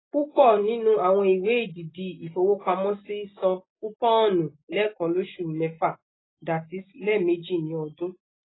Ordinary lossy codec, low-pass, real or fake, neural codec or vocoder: AAC, 16 kbps; 7.2 kHz; real; none